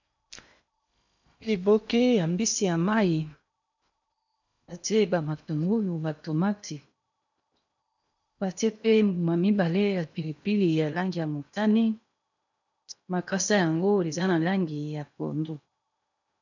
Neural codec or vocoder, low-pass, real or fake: codec, 16 kHz in and 24 kHz out, 0.8 kbps, FocalCodec, streaming, 65536 codes; 7.2 kHz; fake